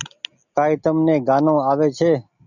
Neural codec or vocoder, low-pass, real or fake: none; 7.2 kHz; real